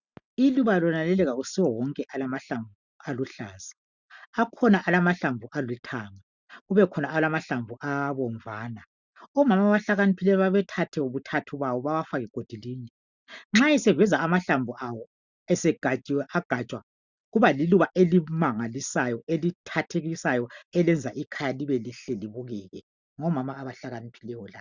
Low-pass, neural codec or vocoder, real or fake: 7.2 kHz; none; real